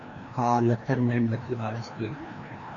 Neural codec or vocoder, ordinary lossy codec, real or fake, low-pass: codec, 16 kHz, 1 kbps, FreqCodec, larger model; AAC, 48 kbps; fake; 7.2 kHz